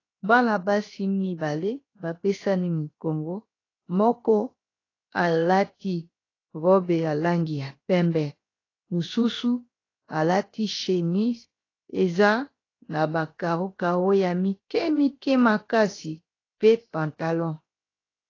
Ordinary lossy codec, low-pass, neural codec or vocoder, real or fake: AAC, 32 kbps; 7.2 kHz; codec, 16 kHz, 0.7 kbps, FocalCodec; fake